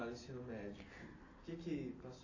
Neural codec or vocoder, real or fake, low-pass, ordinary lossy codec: none; real; 7.2 kHz; AAC, 32 kbps